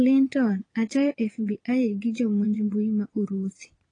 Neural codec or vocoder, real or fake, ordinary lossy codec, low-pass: vocoder, 22.05 kHz, 80 mel bands, Vocos; fake; AAC, 32 kbps; 9.9 kHz